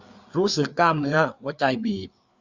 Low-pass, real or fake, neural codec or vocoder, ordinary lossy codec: 7.2 kHz; fake; codec, 16 kHz, 4 kbps, FreqCodec, larger model; Opus, 64 kbps